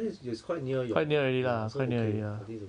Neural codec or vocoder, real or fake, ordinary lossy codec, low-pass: none; real; none; 9.9 kHz